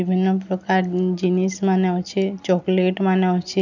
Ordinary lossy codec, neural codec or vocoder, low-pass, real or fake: none; none; 7.2 kHz; real